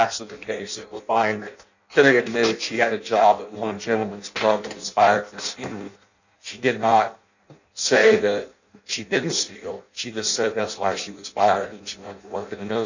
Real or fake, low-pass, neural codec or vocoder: fake; 7.2 kHz; codec, 16 kHz in and 24 kHz out, 0.6 kbps, FireRedTTS-2 codec